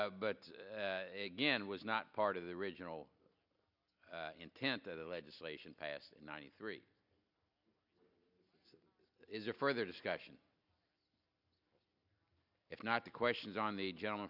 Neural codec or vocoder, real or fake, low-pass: none; real; 5.4 kHz